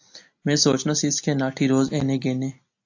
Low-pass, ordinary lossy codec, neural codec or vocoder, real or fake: 7.2 kHz; AAC, 48 kbps; none; real